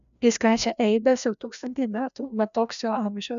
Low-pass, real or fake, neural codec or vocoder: 7.2 kHz; fake; codec, 16 kHz, 1 kbps, FreqCodec, larger model